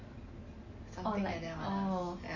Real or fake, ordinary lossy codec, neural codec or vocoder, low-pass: real; MP3, 32 kbps; none; 7.2 kHz